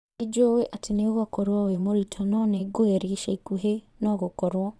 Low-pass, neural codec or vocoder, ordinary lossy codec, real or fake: none; vocoder, 22.05 kHz, 80 mel bands, Vocos; none; fake